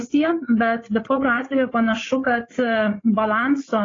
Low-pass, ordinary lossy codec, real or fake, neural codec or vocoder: 7.2 kHz; AAC, 32 kbps; fake; codec, 16 kHz, 8 kbps, FreqCodec, larger model